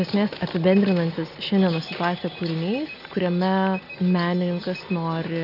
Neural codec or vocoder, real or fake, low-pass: none; real; 5.4 kHz